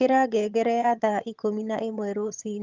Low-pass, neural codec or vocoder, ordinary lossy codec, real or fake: 7.2 kHz; vocoder, 22.05 kHz, 80 mel bands, HiFi-GAN; Opus, 24 kbps; fake